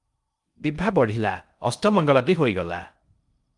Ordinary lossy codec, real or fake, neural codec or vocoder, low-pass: Opus, 32 kbps; fake; codec, 16 kHz in and 24 kHz out, 0.6 kbps, FocalCodec, streaming, 4096 codes; 10.8 kHz